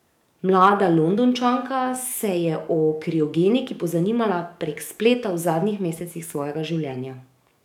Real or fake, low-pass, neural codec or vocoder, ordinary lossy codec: fake; 19.8 kHz; codec, 44.1 kHz, 7.8 kbps, DAC; none